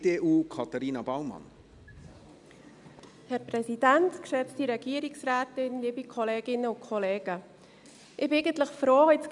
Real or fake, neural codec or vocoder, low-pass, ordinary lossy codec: real; none; 10.8 kHz; none